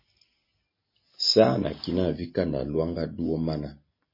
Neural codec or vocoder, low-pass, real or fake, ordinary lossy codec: none; 5.4 kHz; real; MP3, 24 kbps